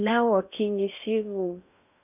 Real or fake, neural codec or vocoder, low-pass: fake; codec, 16 kHz in and 24 kHz out, 0.6 kbps, FocalCodec, streaming, 4096 codes; 3.6 kHz